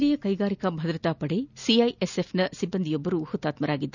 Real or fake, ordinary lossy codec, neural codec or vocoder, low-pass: real; none; none; 7.2 kHz